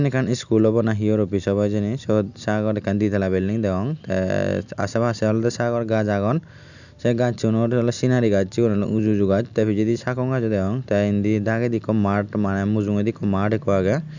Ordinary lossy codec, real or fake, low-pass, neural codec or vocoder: none; real; 7.2 kHz; none